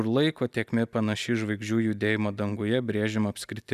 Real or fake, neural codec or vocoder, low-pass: real; none; 14.4 kHz